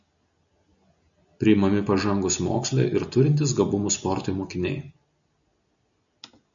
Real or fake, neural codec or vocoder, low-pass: real; none; 7.2 kHz